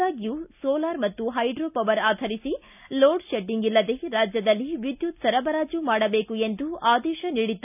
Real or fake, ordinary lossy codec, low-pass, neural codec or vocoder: real; none; 3.6 kHz; none